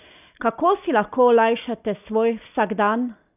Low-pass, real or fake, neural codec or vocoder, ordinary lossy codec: 3.6 kHz; real; none; none